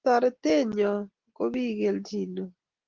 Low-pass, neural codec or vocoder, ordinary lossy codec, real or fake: 7.2 kHz; none; Opus, 16 kbps; real